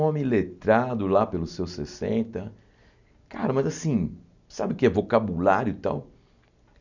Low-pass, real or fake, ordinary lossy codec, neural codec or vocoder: 7.2 kHz; real; none; none